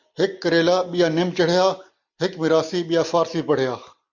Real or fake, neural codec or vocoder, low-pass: real; none; 7.2 kHz